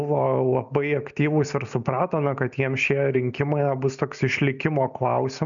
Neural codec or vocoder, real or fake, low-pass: none; real; 7.2 kHz